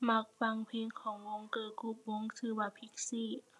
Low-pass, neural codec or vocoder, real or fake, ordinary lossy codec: none; none; real; none